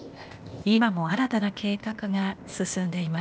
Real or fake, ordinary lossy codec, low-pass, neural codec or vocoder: fake; none; none; codec, 16 kHz, 0.8 kbps, ZipCodec